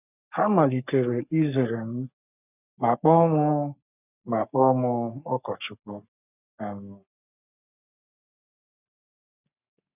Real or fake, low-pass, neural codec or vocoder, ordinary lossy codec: fake; 3.6 kHz; codec, 44.1 kHz, 3.4 kbps, Pupu-Codec; none